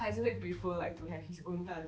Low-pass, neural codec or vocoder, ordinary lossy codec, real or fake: none; codec, 16 kHz, 2 kbps, X-Codec, HuBERT features, trained on balanced general audio; none; fake